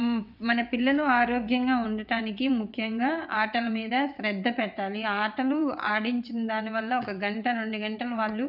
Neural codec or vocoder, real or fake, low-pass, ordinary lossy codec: vocoder, 22.05 kHz, 80 mel bands, WaveNeXt; fake; 5.4 kHz; none